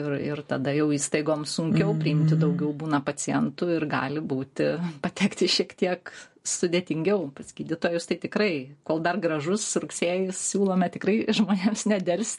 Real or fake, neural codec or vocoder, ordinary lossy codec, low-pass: real; none; MP3, 48 kbps; 10.8 kHz